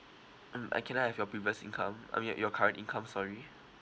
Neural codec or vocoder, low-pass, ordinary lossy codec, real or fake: none; none; none; real